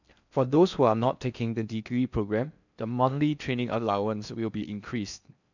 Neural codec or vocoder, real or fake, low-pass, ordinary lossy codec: codec, 16 kHz in and 24 kHz out, 0.6 kbps, FocalCodec, streaming, 4096 codes; fake; 7.2 kHz; none